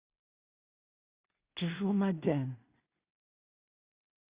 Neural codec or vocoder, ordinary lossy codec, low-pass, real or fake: codec, 16 kHz in and 24 kHz out, 0.4 kbps, LongCat-Audio-Codec, two codebook decoder; Opus, 64 kbps; 3.6 kHz; fake